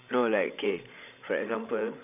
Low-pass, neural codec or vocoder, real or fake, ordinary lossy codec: 3.6 kHz; codec, 16 kHz, 16 kbps, FreqCodec, larger model; fake; none